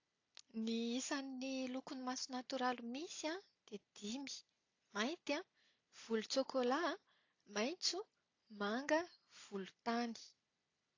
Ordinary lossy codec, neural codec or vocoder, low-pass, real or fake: AAC, 48 kbps; none; 7.2 kHz; real